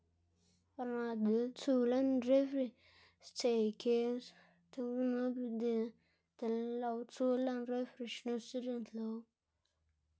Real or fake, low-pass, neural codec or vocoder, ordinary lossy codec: real; none; none; none